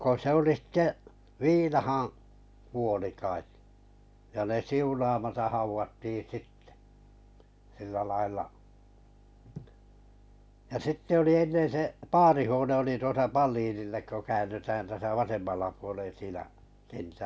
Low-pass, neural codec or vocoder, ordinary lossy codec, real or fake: none; none; none; real